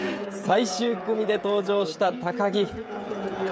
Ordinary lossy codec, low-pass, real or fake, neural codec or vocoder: none; none; fake; codec, 16 kHz, 16 kbps, FreqCodec, smaller model